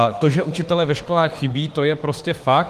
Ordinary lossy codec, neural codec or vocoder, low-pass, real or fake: Opus, 24 kbps; autoencoder, 48 kHz, 32 numbers a frame, DAC-VAE, trained on Japanese speech; 14.4 kHz; fake